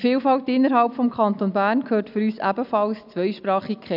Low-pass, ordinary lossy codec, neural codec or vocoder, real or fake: 5.4 kHz; none; none; real